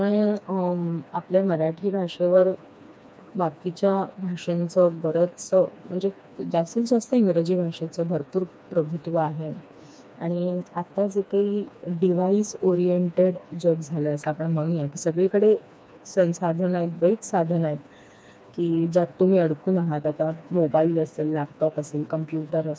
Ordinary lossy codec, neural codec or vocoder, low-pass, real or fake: none; codec, 16 kHz, 2 kbps, FreqCodec, smaller model; none; fake